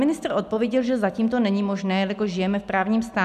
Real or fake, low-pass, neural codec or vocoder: real; 14.4 kHz; none